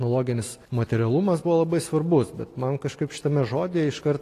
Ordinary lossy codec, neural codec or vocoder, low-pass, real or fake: AAC, 48 kbps; none; 14.4 kHz; real